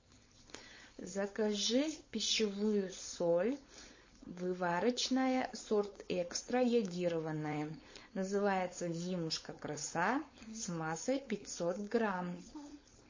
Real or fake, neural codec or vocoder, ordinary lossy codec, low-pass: fake; codec, 16 kHz, 4.8 kbps, FACodec; MP3, 32 kbps; 7.2 kHz